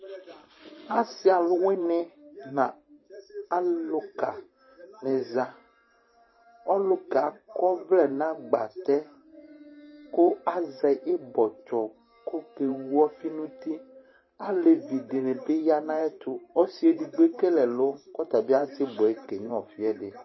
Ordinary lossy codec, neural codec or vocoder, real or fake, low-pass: MP3, 24 kbps; vocoder, 44.1 kHz, 128 mel bands every 512 samples, BigVGAN v2; fake; 7.2 kHz